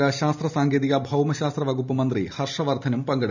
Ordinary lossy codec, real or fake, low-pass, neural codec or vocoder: none; real; 7.2 kHz; none